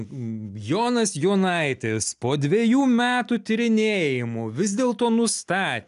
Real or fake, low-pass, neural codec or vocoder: real; 10.8 kHz; none